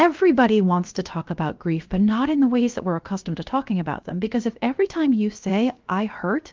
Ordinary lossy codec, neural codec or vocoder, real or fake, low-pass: Opus, 32 kbps; codec, 16 kHz, 0.7 kbps, FocalCodec; fake; 7.2 kHz